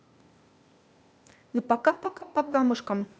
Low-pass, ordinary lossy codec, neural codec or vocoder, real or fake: none; none; codec, 16 kHz, 0.8 kbps, ZipCodec; fake